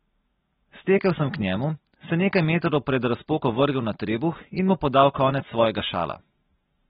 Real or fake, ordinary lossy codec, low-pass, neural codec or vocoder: real; AAC, 16 kbps; 7.2 kHz; none